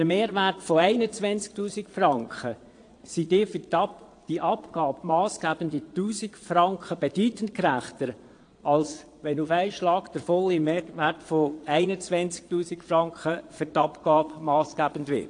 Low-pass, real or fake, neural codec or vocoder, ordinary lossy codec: 9.9 kHz; fake; vocoder, 22.05 kHz, 80 mel bands, Vocos; AAC, 48 kbps